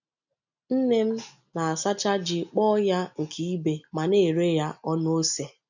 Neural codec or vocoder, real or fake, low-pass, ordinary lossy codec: none; real; 7.2 kHz; none